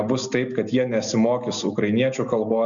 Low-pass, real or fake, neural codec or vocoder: 7.2 kHz; real; none